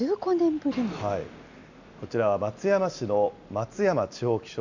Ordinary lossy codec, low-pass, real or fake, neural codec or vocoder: none; 7.2 kHz; real; none